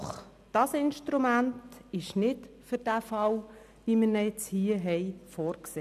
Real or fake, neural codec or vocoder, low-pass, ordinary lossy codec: real; none; 14.4 kHz; none